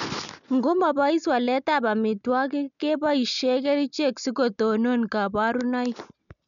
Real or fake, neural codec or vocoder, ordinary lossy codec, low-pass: real; none; none; 7.2 kHz